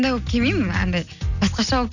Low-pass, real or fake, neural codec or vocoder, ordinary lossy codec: 7.2 kHz; real; none; none